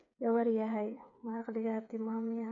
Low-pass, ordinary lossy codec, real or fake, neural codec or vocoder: 7.2 kHz; none; fake; codec, 16 kHz, 8 kbps, FreqCodec, smaller model